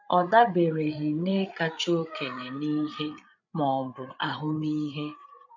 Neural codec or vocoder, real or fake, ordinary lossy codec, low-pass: codec, 16 kHz, 8 kbps, FreqCodec, larger model; fake; none; 7.2 kHz